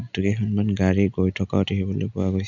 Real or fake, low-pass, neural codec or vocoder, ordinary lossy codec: real; 7.2 kHz; none; none